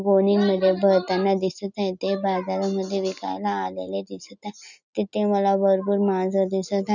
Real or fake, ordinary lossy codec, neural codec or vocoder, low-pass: real; none; none; 7.2 kHz